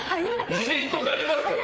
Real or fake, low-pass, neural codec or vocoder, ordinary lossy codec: fake; none; codec, 16 kHz, 4 kbps, FreqCodec, larger model; none